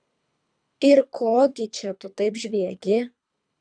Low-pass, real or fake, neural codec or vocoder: 9.9 kHz; fake; codec, 24 kHz, 3 kbps, HILCodec